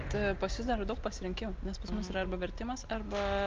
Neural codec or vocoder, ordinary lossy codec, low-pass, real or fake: none; Opus, 32 kbps; 7.2 kHz; real